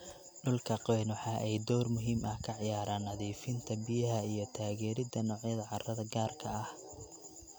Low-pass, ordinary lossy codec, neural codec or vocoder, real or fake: none; none; none; real